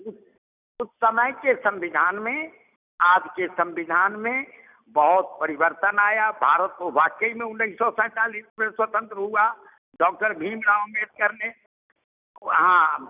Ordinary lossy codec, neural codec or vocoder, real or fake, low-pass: none; none; real; 3.6 kHz